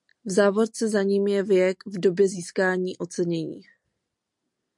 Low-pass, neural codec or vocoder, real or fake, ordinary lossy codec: 10.8 kHz; none; real; MP3, 64 kbps